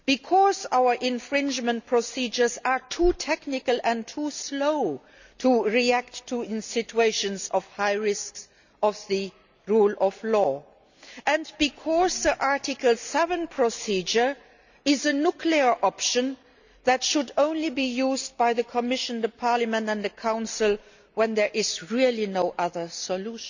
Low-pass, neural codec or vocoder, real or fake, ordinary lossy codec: 7.2 kHz; none; real; none